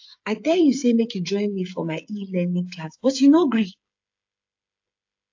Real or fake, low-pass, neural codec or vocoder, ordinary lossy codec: fake; 7.2 kHz; codec, 16 kHz, 8 kbps, FreqCodec, smaller model; AAC, 48 kbps